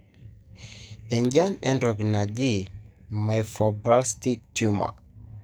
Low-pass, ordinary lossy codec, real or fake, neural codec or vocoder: none; none; fake; codec, 44.1 kHz, 2.6 kbps, SNAC